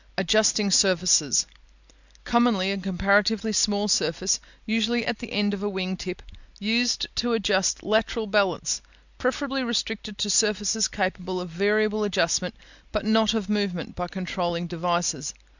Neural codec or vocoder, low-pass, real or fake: none; 7.2 kHz; real